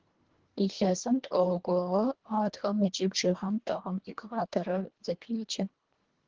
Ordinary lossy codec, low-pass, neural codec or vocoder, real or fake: Opus, 16 kbps; 7.2 kHz; codec, 24 kHz, 1.5 kbps, HILCodec; fake